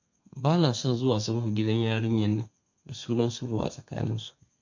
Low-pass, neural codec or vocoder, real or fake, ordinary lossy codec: 7.2 kHz; codec, 32 kHz, 1.9 kbps, SNAC; fake; MP3, 48 kbps